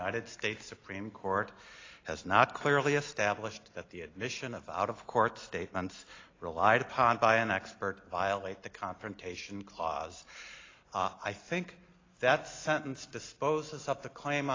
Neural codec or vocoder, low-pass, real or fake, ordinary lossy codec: none; 7.2 kHz; real; AAC, 32 kbps